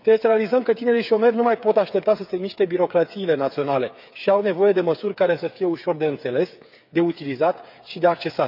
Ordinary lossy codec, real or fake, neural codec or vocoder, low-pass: none; fake; codec, 16 kHz, 8 kbps, FreqCodec, smaller model; 5.4 kHz